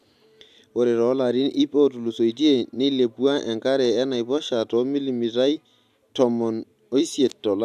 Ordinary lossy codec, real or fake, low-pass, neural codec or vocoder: AAC, 96 kbps; real; 14.4 kHz; none